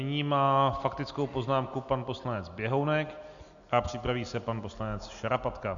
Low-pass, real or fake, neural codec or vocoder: 7.2 kHz; real; none